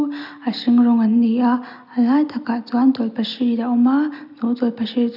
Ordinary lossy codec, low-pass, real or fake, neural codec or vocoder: none; 5.4 kHz; real; none